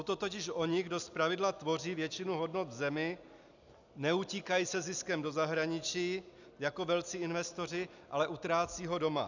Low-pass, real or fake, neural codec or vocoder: 7.2 kHz; real; none